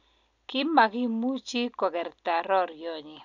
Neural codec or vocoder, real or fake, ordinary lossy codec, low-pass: none; real; none; 7.2 kHz